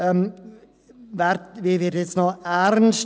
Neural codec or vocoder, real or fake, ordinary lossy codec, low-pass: none; real; none; none